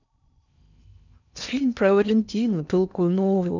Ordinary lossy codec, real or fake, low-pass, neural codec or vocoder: none; fake; 7.2 kHz; codec, 16 kHz in and 24 kHz out, 0.6 kbps, FocalCodec, streaming, 4096 codes